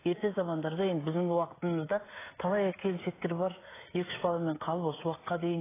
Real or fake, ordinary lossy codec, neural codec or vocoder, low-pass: fake; AAC, 16 kbps; codec, 16 kHz, 16 kbps, FreqCodec, smaller model; 3.6 kHz